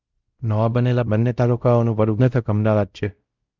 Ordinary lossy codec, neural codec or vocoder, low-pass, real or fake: Opus, 32 kbps; codec, 16 kHz, 0.5 kbps, X-Codec, WavLM features, trained on Multilingual LibriSpeech; 7.2 kHz; fake